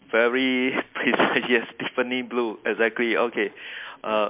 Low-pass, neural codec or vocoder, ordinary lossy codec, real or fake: 3.6 kHz; none; MP3, 32 kbps; real